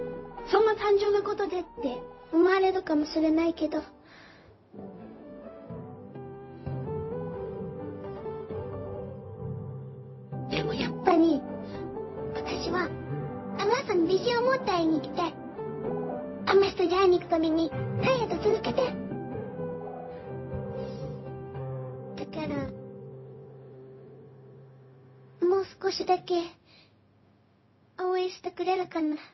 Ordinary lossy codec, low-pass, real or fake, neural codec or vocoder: MP3, 24 kbps; 7.2 kHz; fake; codec, 16 kHz, 0.4 kbps, LongCat-Audio-Codec